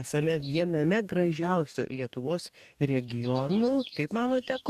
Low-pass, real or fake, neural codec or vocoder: 14.4 kHz; fake; codec, 44.1 kHz, 2.6 kbps, DAC